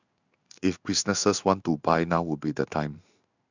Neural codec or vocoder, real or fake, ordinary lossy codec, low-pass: codec, 16 kHz in and 24 kHz out, 1 kbps, XY-Tokenizer; fake; none; 7.2 kHz